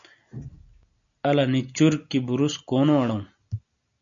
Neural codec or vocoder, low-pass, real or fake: none; 7.2 kHz; real